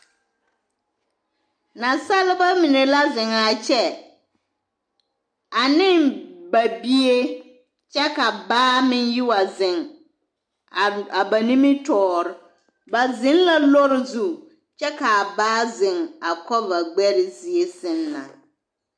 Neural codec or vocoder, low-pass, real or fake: none; 9.9 kHz; real